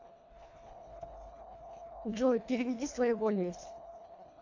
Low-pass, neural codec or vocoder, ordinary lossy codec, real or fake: 7.2 kHz; codec, 24 kHz, 1.5 kbps, HILCodec; AAC, 48 kbps; fake